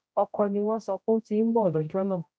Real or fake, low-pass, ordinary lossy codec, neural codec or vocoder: fake; none; none; codec, 16 kHz, 0.5 kbps, X-Codec, HuBERT features, trained on general audio